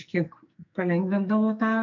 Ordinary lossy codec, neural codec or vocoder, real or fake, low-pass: MP3, 64 kbps; codec, 44.1 kHz, 2.6 kbps, SNAC; fake; 7.2 kHz